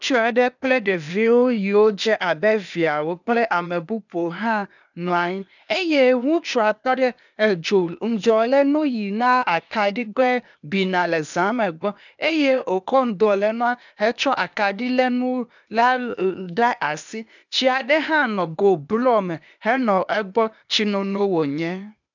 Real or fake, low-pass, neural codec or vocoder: fake; 7.2 kHz; codec, 16 kHz, 0.8 kbps, ZipCodec